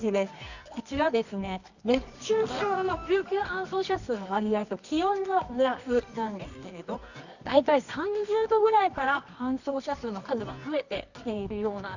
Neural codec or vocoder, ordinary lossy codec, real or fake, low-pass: codec, 24 kHz, 0.9 kbps, WavTokenizer, medium music audio release; none; fake; 7.2 kHz